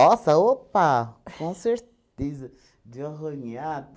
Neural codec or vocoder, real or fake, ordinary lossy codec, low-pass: none; real; none; none